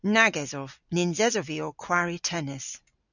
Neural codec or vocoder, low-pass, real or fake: none; 7.2 kHz; real